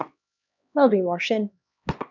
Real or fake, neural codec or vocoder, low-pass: fake; codec, 16 kHz, 1 kbps, X-Codec, HuBERT features, trained on LibriSpeech; 7.2 kHz